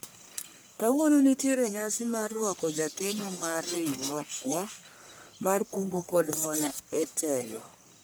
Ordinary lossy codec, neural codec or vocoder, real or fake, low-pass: none; codec, 44.1 kHz, 1.7 kbps, Pupu-Codec; fake; none